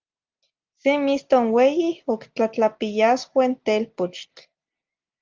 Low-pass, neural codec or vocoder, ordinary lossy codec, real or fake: 7.2 kHz; none; Opus, 24 kbps; real